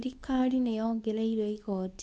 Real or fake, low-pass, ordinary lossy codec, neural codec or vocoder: fake; none; none; codec, 24 kHz, 0.9 kbps, WavTokenizer, medium speech release version 2